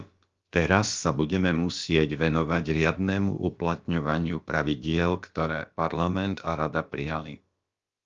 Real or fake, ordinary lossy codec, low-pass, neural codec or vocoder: fake; Opus, 32 kbps; 7.2 kHz; codec, 16 kHz, about 1 kbps, DyCAST, with the encoder's durations